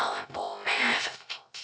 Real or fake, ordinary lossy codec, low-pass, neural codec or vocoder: fake; none; none; codec, 16 kHz, 0.3 kbps, FocalCodec